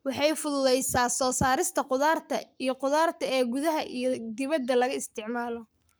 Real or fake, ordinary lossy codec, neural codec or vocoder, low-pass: fake; none; codec, 44.1 kHz, 7.8 kbps, Pupu-Codec; none